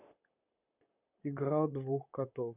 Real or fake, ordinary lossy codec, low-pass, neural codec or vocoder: fake; none; 3.6 kHz; vocoder, 44.1 kHz, 128 mel bands, Pupu-Vocoder